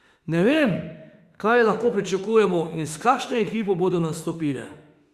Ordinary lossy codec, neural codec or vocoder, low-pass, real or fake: Opus, 64 kbps; autoencoder, 48 kHz, 32 numbers a frame, DAC-VAE, trained on Japanese speech; 14.4 kHz; fake